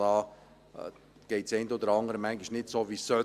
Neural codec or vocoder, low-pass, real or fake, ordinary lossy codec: none; 14.4 kHz; real; Opus, 64 kbps